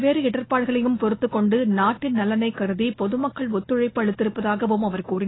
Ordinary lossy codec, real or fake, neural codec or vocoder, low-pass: AAC, 16 kbps; real; none; 7.2 kHz